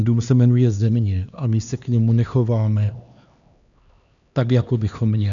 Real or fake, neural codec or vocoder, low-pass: fake; codec, 16 kHz, 2 kbps, X-Codec, HuBERT features, trained on LibriSpeech; 7.2 kHz